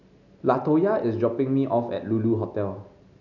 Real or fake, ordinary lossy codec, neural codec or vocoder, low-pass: real; none; none; 7.2 kHz